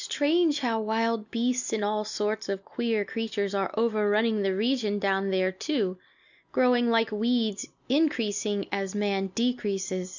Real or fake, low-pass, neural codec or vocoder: real; 7.2 kHz; none